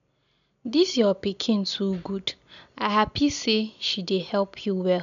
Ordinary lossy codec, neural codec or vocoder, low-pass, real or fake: none; none; 7.2 kHz; real